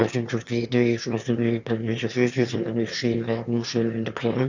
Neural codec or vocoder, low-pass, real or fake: autoencoder, 22.05 kHz, a latent of 192 numbers a frame, VITS, trained on one speaker; 7.2 kHz; fake